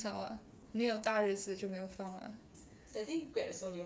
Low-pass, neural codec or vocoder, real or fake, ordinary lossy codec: none; codec, 16 kHz, 4 kbps, FreqCodec, smaller model; fake; none